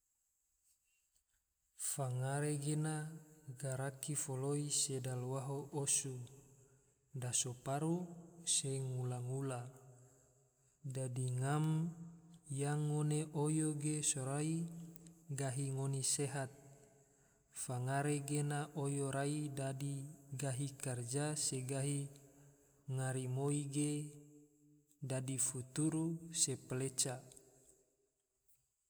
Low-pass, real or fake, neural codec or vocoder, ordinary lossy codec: none; real; none; none